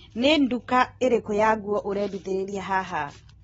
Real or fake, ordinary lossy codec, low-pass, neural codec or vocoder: real; AAC, 24 kbps; 19.8 kHz; none